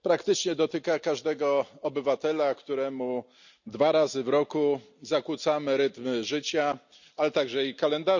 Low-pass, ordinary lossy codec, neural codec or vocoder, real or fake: 7.2 kHz; none; none; real